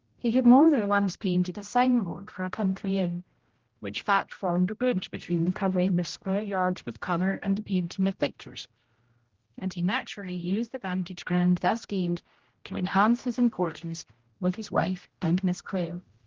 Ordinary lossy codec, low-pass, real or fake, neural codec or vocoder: Opus, 16 kbps; 7.2 kHz; fake; codec, 16 kHz, 0.5 kbps, X-Codec, HuBERT features, trained on general audio